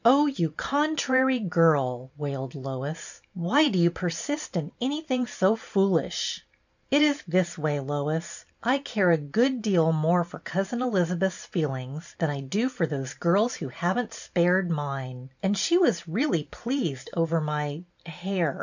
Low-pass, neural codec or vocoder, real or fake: 7.2 kHz; vocoder, 44.1 kHz, 128 mel bands every 512 samples, BigVGAN v2; fake